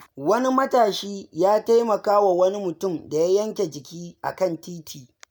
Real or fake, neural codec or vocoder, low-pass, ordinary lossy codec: real; none; none; none